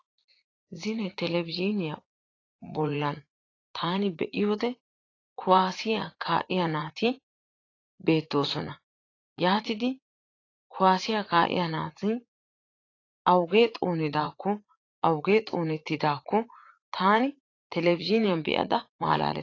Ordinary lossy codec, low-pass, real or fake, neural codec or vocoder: AAC, 32 kbps; 7.2 kHz; fake; vocoder, 44.1 kHz, 80 mel bands, Vocos